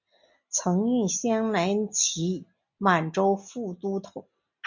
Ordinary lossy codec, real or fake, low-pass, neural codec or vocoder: MP3, 64 kbps; real; 7.2 kHz; none